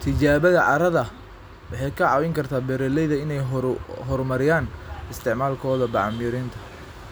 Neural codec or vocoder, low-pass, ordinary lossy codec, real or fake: none; none; none; real